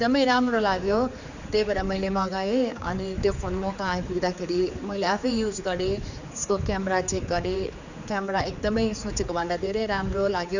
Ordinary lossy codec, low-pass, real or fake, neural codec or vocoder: none; 7.2 kHz; fake; codec, 16 kHz, 4 kbps, X-Codec, HuBERT features, trained on general audio